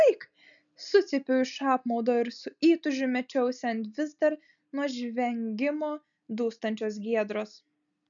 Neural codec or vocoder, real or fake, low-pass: none; real; 7.2 kHz